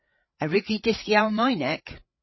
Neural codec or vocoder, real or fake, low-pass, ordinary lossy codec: codec, 16 kHz, 8 kbps, FreqCodec, larger model; fake; 7.2 kHz; MP3, 24 kbps